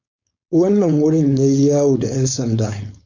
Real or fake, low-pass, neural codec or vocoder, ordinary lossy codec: fake; 7.2 kHz; codec, 16 kHz, 4.8 kbps, FACodec; MP3, 48 kbps